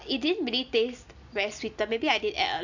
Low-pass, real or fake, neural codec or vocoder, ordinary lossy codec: 7.2 kHz; real; none; none